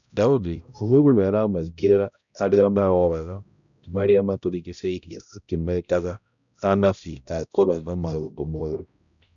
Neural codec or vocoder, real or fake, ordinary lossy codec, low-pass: codec, 16 kHz, 0.5 kbps, X-Codec, HuBERT features, trained on balanced general audio; fake; none; 7.2 kHz